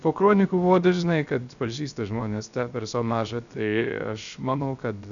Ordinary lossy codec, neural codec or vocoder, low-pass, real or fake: MP3, 96 kbps; codec, 16 kHz, 0.3 kbps, FocalCodec; 7.2 kHz; fake